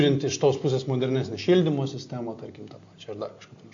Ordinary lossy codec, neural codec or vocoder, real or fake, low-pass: AAC, 48 kbps; none; real; 7.2 kHz